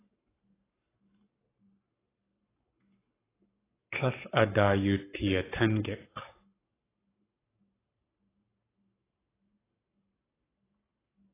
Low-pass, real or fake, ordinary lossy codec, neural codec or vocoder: 3.6 kHz; fake; AAC, 16 kbps; codec, 44.1 kHz, 7.8 kbps, Pupu-Codec